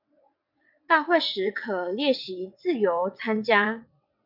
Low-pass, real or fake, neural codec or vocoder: 5.4 kHz; fake; vocoder, 22.05 kHz, 80 mel bands, WaveNeXt